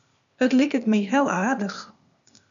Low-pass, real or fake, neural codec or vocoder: 7.2 kHz; fake; codec, 16 kHz, 0.8 kbps, ZipCodec